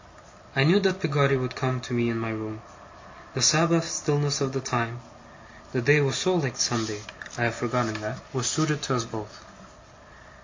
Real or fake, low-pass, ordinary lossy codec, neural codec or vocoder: real; 7.2 kHz; MP3, 48 kbps; none